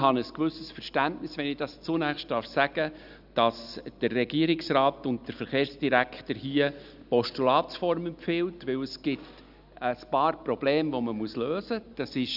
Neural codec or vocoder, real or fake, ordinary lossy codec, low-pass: none; real; none; 5.4 kHz